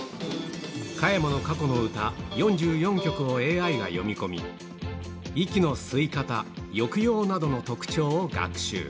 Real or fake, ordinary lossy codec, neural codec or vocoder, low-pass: real; none; none; none